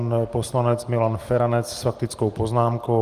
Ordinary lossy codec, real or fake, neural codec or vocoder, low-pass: Opus, 24 kbps; real; none; 14.4 kHz